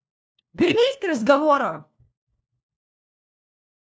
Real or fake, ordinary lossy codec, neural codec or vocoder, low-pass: fake; none; codec, 16 kHz, 1 kbps, FunCodec, trained on LibriTTS, 50 frames a second; none